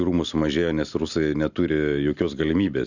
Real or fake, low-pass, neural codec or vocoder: real; 7.2 kHz; none